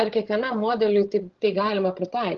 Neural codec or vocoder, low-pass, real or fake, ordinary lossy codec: codec, 16 kHz, 16 kbps, FreqCodec, larger model; 7.2 kHz; fake; Opus, 16 kbps